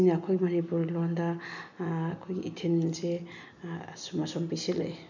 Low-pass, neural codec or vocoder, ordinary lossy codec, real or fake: 7.2 kHz; none; none; real